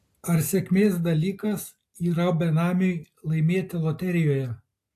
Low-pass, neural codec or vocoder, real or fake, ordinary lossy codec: 14.4 kHz; none; real; AAC, 64 kbps